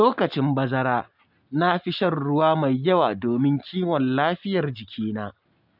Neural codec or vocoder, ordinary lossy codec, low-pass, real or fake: none; none; 5.4 kHz; real